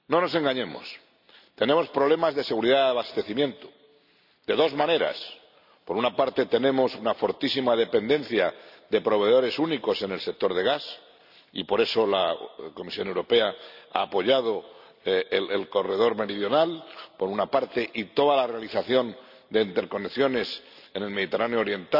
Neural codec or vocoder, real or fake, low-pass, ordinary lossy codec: none; real; 5.4 kHz; none